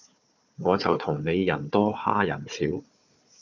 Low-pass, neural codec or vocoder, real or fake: 7.2 kHz; codec, 16 kHz, 4 kbps, FunCodec, trained on Chinese and English, 50 frames a second; fake